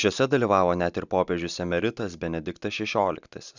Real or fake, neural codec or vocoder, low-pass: real; none; 7.2 kHz